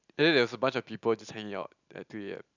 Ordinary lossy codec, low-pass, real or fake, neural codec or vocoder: none; 7.2 kHz; real; none